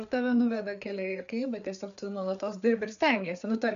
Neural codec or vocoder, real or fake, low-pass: codec, 16 kHz, 4 kbps, FreqCodec, larger model; fake; 7.2 kHz